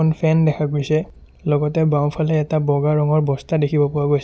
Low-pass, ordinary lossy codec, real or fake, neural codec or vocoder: none; none; real; none